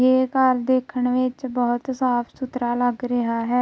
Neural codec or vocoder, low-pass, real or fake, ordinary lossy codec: none; none; real; none